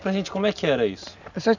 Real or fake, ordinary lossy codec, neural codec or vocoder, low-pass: real; none; none; 7.2 kHz